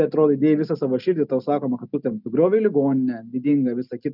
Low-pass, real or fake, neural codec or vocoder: 5.4 kHz; real; none